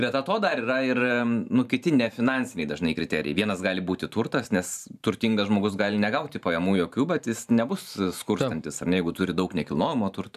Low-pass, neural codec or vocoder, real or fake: 14.4 kHz; vocoder, 44.1 kHz, 128 mel bands every 512 samples, BigVGAN v2; fake